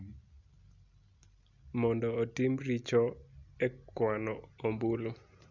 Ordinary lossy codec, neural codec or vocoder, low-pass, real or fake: none; none; 7.2 kHz; real